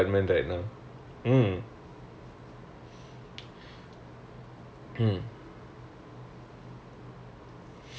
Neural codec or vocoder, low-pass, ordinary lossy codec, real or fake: none; none; none; real